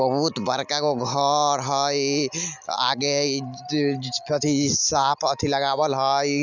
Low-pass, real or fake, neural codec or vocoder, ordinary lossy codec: 7.2 kHz; real; none; none